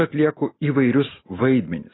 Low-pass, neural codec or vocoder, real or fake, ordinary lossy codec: 7.2 kHz; none; real; AAC, 16 kbps